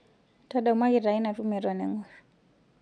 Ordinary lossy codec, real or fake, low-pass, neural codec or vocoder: none; real; 9.9 kHz; none